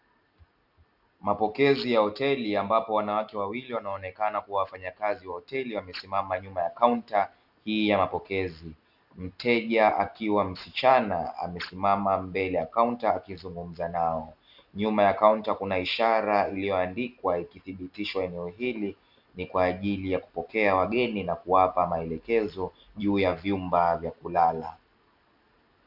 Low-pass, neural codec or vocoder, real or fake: 5.4 kHz; none; real